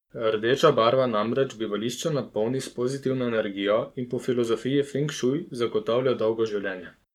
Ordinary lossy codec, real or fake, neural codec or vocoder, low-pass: none; fake; codec, 44.1 kHz, 7.8 kbps, Pupu-Codec; 19.8 kHz